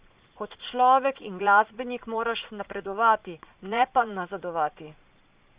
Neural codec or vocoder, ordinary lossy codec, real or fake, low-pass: vocoder, 22.05 kHz, 80 mel bands, Vocos; Opus, 64 kbps; fake; 3.6 kHz